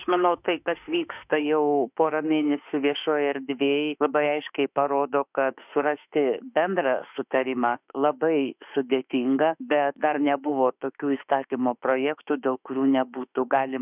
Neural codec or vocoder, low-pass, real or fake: autoencoder, 48 kHz, 32 numbers a frame, DAC-VAE, trained on Japanese speech; 3.6 kHz; fake